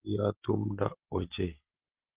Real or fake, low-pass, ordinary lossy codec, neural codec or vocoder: real; 3.6 kHz; Opus, 16 kbps; none